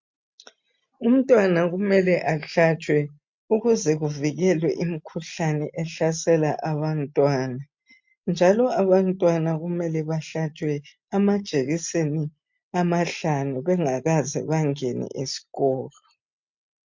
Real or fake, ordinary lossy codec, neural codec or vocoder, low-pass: real; MP3, 48 kbps; none; 7.2 kHz